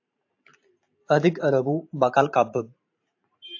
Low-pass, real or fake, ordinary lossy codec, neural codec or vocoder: 7.2 kHz; fake; AAC, 48 kbps; vocoder, 44.1 kHz, 128 mel bands every 512 samples, BigVGAN v2